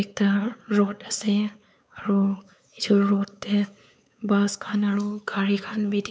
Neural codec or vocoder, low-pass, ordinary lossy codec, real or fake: codec, 16 kHz, 4 kbps, X-Codec, WavLM features, trained on Multilingual LibriSpeech; none; none; fake